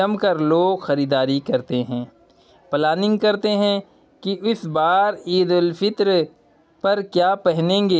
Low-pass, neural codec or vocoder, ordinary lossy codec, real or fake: none; none; none; real